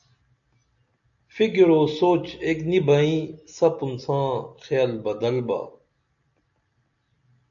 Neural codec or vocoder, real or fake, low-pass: none; real; 7.2 kHz